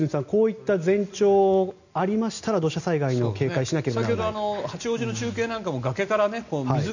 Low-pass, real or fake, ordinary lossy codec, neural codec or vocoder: 7.2 kHz; real; none; none